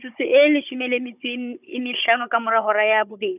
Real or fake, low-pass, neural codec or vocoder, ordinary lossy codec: fake; 3.6 kHz; codec, 16 kHz, 16 kbps, FunCodec, trained on Chinese and English, 50 frames a second; none